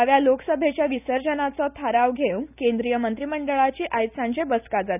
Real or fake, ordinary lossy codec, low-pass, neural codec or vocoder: real; none; 3.6 kHz; none